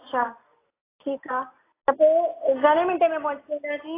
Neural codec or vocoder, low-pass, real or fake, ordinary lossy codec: none; 3.6 kHz; real; AAC, 16 kbps